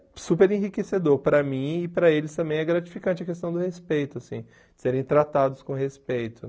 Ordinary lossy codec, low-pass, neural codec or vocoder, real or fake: none; none; none; real